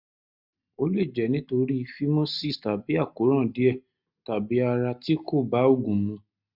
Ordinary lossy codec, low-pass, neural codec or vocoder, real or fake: none; 5.4 kHz; none; real